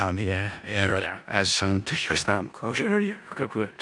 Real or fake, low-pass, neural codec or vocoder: fake; 10.8 kHz; codec, 16 kHz in and 24 kHz out, 0.4 kbps, LongCat-Audio-Codec, four codebook decoder